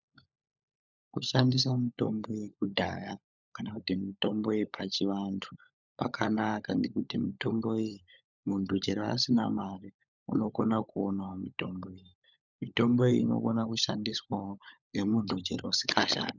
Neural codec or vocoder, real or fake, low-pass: codec, 16 kHz, 16 kbps, FunCodec, trained on LibriTTS, 50 frames a second; fake; 7.2 kHz